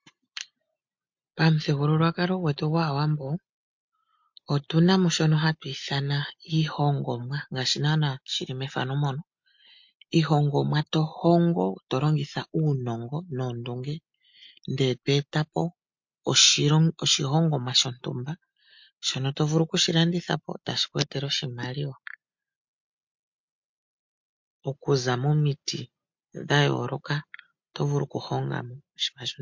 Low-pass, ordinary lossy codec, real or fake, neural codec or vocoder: 7.2 kHz; MP3, 48 kbps; real; none